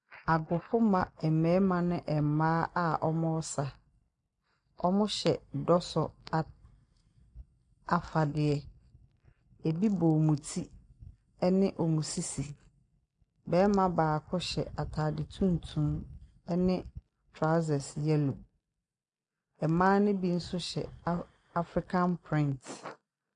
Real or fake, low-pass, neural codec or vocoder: real; 9.9 kHz; none